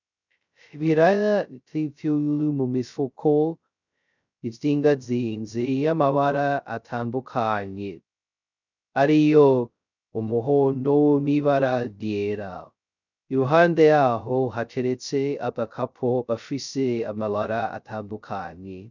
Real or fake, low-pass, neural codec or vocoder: fake; 7.2 kHz; codec, 16 kHz, 0.2 kbps, FocalCodec